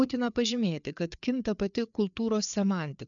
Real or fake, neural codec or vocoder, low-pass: fake; codec, 16 kHz, 4 kbps, FreqCodec, larger model; 7.2 kHz